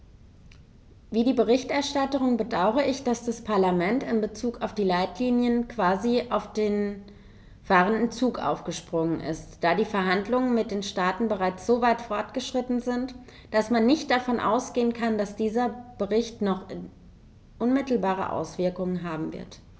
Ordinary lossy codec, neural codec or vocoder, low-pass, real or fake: none; none; none; real